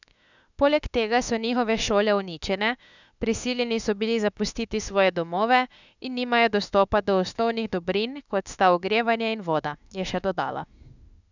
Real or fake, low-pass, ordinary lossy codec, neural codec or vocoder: fake; 7.2 kHz; none; autoencoder, 48 kHz, 32 numbers a frame, DAC-VAE, trained on Japanese speech